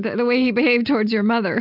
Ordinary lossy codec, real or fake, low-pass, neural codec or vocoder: AAC, 48 kbps; real; 5.4 kHz; none